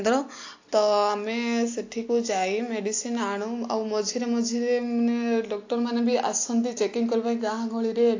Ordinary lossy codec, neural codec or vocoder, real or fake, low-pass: AAC, 48 kbps; none; real; 7.2 kHz